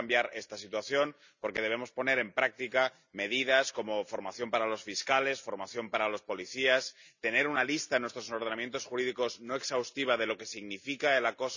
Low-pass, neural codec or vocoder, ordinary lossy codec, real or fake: 7.2 kHz; none; none; real